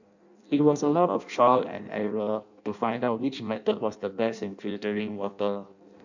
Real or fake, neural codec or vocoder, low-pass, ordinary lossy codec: fake; codec, 16 kHz in and 24 kHz out, 0.6 kbps, FireRedTTS-2 codec; 7.2 kHz; none